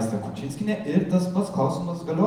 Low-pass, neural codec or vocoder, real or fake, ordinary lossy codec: 19.8 kHz; none; real; Opus, 32 kbps